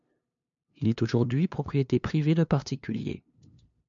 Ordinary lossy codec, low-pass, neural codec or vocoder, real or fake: MP3, 64 kbps; 7.2 kHz; codec, 16 kHz, 2 kbps, FunCodec, trained on LibriTTS, 25 frames a second; fake